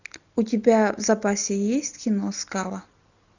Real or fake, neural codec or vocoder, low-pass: real; none; 7.2 kHz